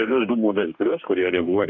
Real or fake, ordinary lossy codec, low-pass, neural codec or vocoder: fake; MP3, 64 kbps; 7.2 kHz; codec, 44.1 kHz, 2.6 kbps, DAC